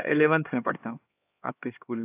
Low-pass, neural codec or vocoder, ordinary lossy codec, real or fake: 3.6 kHz; codec, 16 kHz, 2 kbps, X-Codec, HuBERT features, trained on LibriSpeech; AAC, 24 kbps; fake